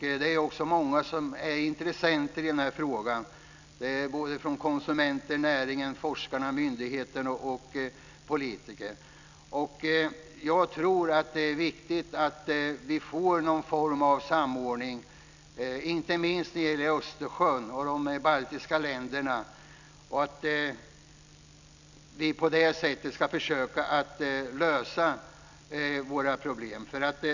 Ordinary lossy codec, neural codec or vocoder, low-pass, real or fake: none; none; 7.2 kHz; real